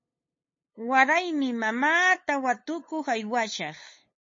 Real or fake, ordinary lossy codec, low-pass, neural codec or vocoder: fake; MP3, 32 kbps; 7.2 kHz; codec, 16 kHz, 8 kbps, FunCodec, trained on LibriTTS, 25 frames a second